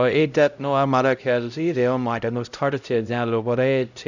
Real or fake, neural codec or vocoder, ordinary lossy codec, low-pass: fake; codec, 16 kHz, 0.5 kbps, X-Codec, HuBERT features, trained on LibriSpeech; none; 7.2 kHz